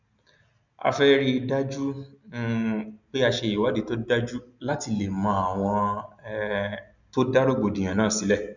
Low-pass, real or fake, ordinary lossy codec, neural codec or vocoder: 7.2 kHz; real; none; none